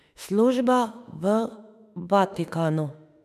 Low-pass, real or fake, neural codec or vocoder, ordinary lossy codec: 14.4 kHz; fake; autoencoder, 48 kHz, 32 numbers a frame, DAC-VAE, trained on Japanese speech; none